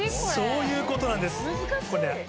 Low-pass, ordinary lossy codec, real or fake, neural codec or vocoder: none; none; real; none